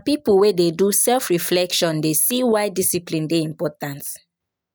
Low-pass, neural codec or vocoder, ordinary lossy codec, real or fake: none; none; none; real